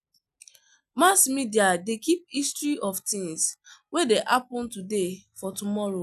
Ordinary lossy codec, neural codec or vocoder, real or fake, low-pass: none; none; real; 14.4 kHz